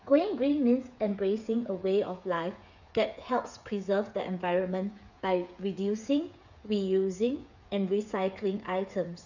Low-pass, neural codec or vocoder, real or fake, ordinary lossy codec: 7.2 kHz; codec, 16 kHz, 8 kbps, FreqCodec, smaller model; fake; none